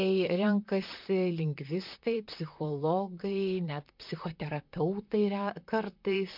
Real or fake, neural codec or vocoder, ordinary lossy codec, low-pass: fake; vocoder, 44.1 kHz, 128 mel bands, Pupu-Vocoder; MP3, 48 kbps; 5.4 kHz